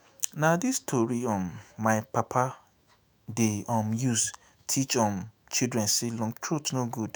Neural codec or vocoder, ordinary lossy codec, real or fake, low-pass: autoencoder, 48 kHz, 128 numbers a frame, DAC-VAE, trained on Japanese speech; none; fake; none